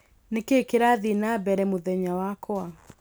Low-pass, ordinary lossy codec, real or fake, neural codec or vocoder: none; none; real; none